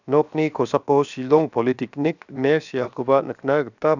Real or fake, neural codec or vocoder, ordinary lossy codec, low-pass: fake; codec, 16 kHz, 0.7 kbps, FocalCodec; none; 7.2 kHz